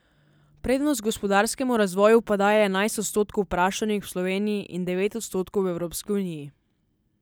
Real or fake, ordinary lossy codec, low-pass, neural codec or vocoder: real; none; none; none